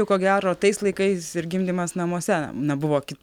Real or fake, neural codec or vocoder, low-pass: real; none; 19.8 kHz